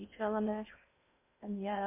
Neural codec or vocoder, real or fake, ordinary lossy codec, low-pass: codec, 16 kHz in and 24 kHz out, 0.6 kbps, FocalCodec, streaming, 2048 codes; fake; MP3, 24 kbps; 3.6 kHz